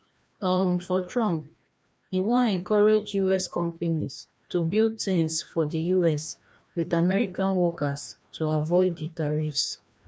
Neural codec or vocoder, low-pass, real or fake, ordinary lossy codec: codec, 16 kHz, 1 kbps, FreqCodec, larger model; none; fake; none